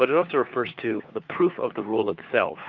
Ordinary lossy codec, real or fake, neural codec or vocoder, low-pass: Opus, 32 kbps; fake; codec, 16 kHz, 4 kbps, FunCodec, trained on LibriTTS, 50 frames a second; 7.2 kHz